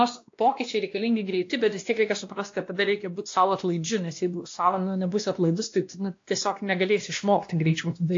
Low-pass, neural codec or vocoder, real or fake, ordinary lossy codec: 7.2 kHz; codec, 16 kHz, 1 kbps, X-Codec, WavLM features, trained on Multilingual LibriSpeech; fake; AAC, 48 kbps